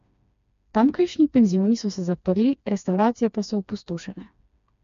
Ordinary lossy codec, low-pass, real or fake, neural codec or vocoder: MP3, 64 kbps; 7.2 kHz; fake; codec, 16 kHz, 2 kbps, FreqCodec, smaller model